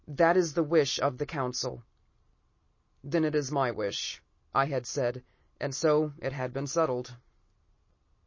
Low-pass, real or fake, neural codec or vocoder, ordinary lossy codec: 7.2 kHz; real; none; MP3, 32 kbps